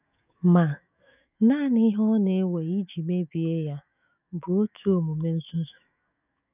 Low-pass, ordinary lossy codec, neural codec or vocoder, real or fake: 3.6 kHz; none; autoencoder, 48 kHz, 128 numbers a frame, DAC-VAE, trained on Japanese speech; fake